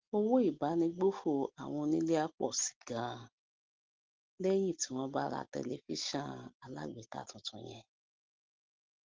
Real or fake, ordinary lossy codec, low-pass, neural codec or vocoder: real; Opus, 16 kbps; 7.2 kHz; none